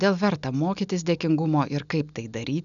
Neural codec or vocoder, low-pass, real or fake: none; 7.2 kHz; real